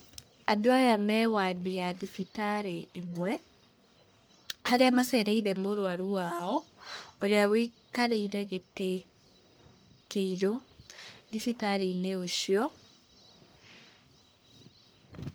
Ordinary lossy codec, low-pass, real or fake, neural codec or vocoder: none; none; fake; codec, 44.1 kHz, 1.7 kbps, Pupu-Codec